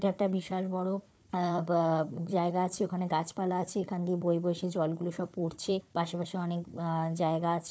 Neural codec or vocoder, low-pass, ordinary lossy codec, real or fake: codec, 16 kHz, 4 kbps, FunCodec, trained on Chinese and English, 50 frames a second; none; none; fake